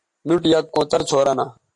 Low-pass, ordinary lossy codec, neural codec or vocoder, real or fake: 10.8 kHz; MP3, 48 kbps; none; real